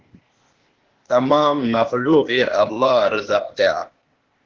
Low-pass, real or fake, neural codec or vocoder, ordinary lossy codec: 7.2 kHz; fake; codec, 16 kHz, 0.8 kbps, ZipCodec; Opus, 16 kbps